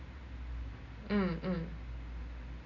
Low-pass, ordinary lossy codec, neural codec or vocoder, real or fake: 7.2 kHz; none; none; real